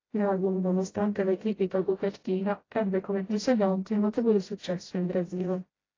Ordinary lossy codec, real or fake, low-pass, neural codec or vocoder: AAC, 32 kbps; fake; 7.2 kHz; codec, 16 kHz, 0.5 kbps, FreqCodec, smaller model